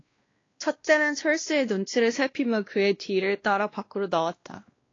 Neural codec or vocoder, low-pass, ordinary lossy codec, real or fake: codec, 16 kHz, 1 kbps, X-Codec, WavLM features, trained on Multilingual LibriSpeech; 7.2 kHz; AAC, 32 kbps; fake